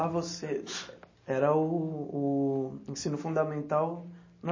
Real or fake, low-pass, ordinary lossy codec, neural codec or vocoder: real; 7.2 kHz; MP3, 32 kbps; none